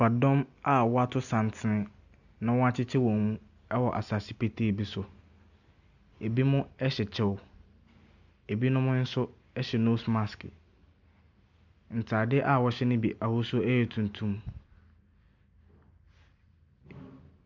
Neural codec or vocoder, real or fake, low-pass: none; real; 7.2 kHz